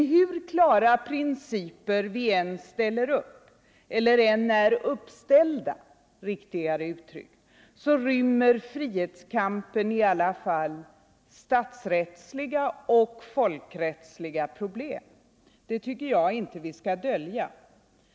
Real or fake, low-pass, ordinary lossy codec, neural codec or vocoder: real; none; none; none